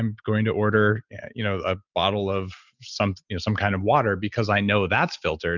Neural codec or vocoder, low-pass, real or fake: none; 7.2 kHz; real